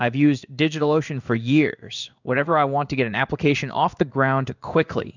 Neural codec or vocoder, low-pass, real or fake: codec, 16 kHz in and 24 kHz out, 1 kbps, XY-Tokenizer; 7.2 kHz; fake